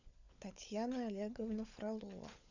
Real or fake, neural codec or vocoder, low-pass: fake; codec, 16 kHz, 16 kbps, FunCodec, trained on LibriTTS, 50 frames a second; 7.2 kHz